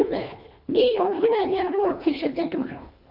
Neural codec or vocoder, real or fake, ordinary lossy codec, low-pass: codec, 24 kHz, 1.5 kbps, HILCodec; fake; none; 5.4 kHz